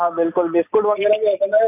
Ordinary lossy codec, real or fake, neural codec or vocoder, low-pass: none; real; none; 3.6 kHz